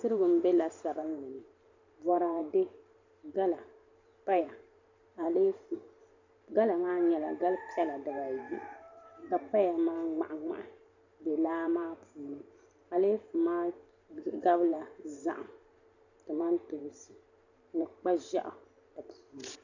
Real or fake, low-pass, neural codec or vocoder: fake; 7.2 kHz; vocoder, 44.1 kHz, 128 mel bands every 512 samples, BigVGAN v2